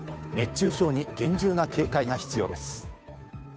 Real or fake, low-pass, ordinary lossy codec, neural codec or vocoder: fake; none; none; codec, 16 kHz, 2 kbps, FunCodec, trained on Chinese and English, 25 frames a second